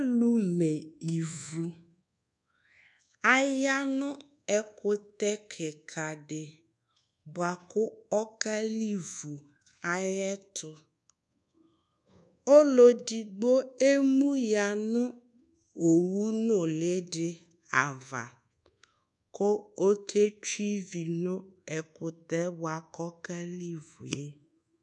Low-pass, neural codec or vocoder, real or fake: 10.8 kHz; codec, 24 kHz, 1.2 kbps, DualCodec; fake